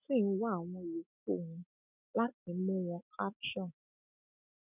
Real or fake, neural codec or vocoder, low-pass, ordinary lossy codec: real; none; 3.6 kHz; none